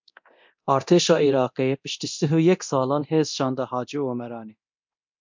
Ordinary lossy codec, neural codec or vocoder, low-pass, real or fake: MP3, 64 kbps; codec, 24 kHz, 0.9 kbps, DualCodec; 7.2 kHz; fake